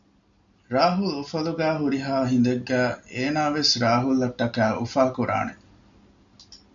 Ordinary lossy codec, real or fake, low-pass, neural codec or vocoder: MP3, 96 kbps; real; 7.2 kHz; none